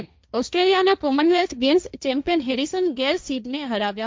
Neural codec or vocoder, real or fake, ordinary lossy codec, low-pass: codec, 16 kHz, 1.1 kbps, Voila-Tokenizer; fake; none; none